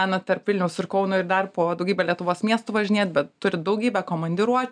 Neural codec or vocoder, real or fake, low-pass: none; real; 9.9 kHz